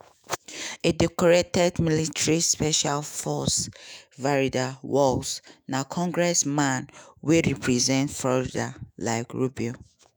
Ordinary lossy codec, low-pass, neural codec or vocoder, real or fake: none; none; autoencoder, 48 kHz, 128 numbers a frame, DAC-VAE, trained on Japanese speech; fake